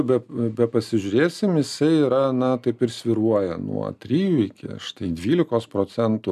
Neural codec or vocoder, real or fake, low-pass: none; real; 14.4 kHz